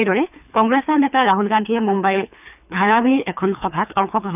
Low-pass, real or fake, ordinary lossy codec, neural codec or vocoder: 3.6 kHz; fake; none; codec, 24 kHz, 3 kbps, HILCodec